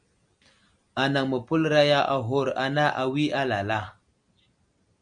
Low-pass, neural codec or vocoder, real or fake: 9.9 kHz; none; real